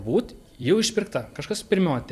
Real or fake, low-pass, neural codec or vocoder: fake; 14.4 kHz; vocoder, 44.1 kHz, 128 mel bands every 256 samples, BigVGAN v2